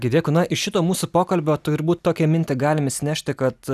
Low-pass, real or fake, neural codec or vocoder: 14.4 kHz; real; none